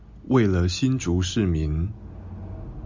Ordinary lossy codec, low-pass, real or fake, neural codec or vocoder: MP3, 64 kbps; 7.2 kHz; real; none